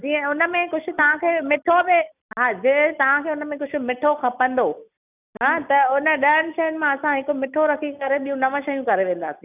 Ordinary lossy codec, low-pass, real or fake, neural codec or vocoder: none; 3.6 kHz; real; none